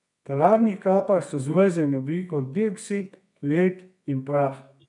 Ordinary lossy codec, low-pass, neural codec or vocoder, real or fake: none; 10.8 kHz; codec, 24 kHz, 0.9 kbps, WavTokenizer, medium music audio release; fake